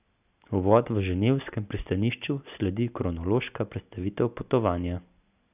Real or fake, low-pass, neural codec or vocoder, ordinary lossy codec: real; 3.6 kHz; none; none